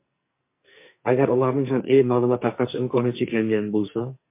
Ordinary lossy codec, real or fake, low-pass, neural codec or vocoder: MP3, 24 kbps; fake; 3.6 kHz; codec, 44.1 kHz, 2.6 kbps, SNAC